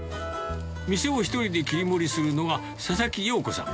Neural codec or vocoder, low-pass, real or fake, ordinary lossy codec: none; none; real; none